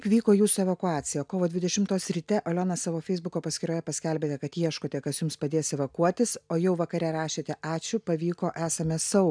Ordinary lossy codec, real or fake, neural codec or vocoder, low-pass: MP3, 96 kbps; real; none; 9.9 kHz